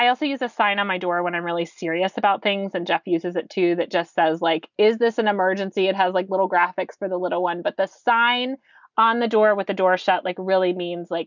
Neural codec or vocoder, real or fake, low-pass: none; real; 7.2 kHz